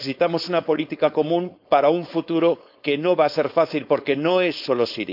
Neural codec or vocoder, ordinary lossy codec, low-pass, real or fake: codec, 16 kHz, 4.8 kbps, FACodec; none; 5.4 kHz; fake